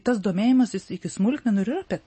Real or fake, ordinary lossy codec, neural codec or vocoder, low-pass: real; MP3, 32 kbps; none; 10.8 kHz